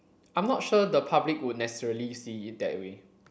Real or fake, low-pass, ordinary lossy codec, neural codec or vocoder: real; none; none; none